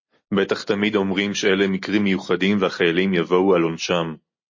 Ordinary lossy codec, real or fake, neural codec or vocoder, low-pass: MP3, 32 kbps; real; none; 7.2 kHz